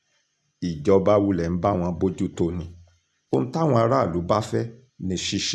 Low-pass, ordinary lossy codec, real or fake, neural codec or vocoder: none; none; real; none